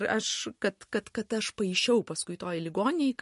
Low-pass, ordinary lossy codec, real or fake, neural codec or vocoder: 14.4 kHz; MP3, 48 kbps; real; none